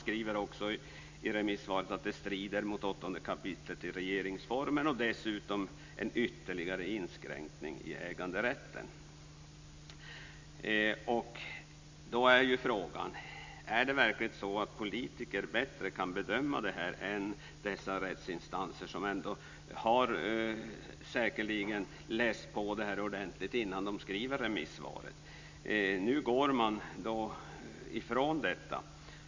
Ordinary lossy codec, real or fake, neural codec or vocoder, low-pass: MP3, 64 kbps; real; none; 7.2 kHz